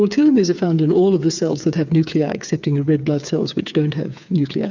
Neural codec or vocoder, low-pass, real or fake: codec, 16 kHz, 8 kbps, FreqCodec, smaller model; 7.2 kHz; fake